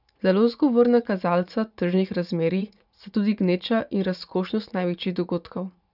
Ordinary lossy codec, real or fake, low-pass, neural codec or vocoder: none; fake; 5.4 kHz; vocoder, 44.1 kHz, 80 mel bands, Vocos